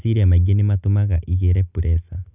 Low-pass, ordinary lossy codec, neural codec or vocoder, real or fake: 3.6 kHz; none; none; real